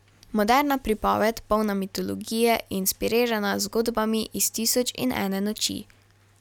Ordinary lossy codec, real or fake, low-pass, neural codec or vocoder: none; real; 19.8 kHz; none